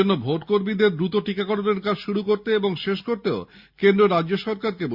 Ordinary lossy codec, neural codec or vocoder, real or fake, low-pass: Opus, 64 kbps; none; real; 5.4 kHz